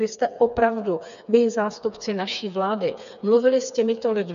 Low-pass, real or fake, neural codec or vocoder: 7.2 kHz; fake; codec, 16 kHz, 4 kbps, FreqCodec, smaller model